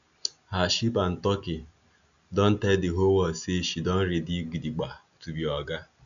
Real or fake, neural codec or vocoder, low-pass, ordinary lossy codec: real; none; 7.2 kHz; none